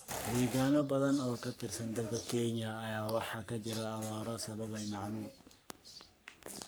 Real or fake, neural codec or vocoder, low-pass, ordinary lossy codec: fake; codec, 44.1 kHz, 3.4 kbps, Pupu-Codec; none; none